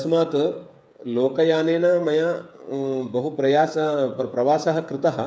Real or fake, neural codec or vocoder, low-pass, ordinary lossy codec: fake; codec, 16 kHz, 8 kbps, FreqCodec, smaller model; none; none